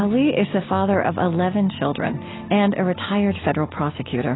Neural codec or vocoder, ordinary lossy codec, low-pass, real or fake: none; AAC, 16 kbps; 7.2 kHz; real